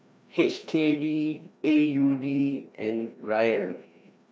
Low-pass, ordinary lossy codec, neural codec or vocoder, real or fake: none; none; codec, 16 kHz, 1 kbps, FreqCodec, larger model; fake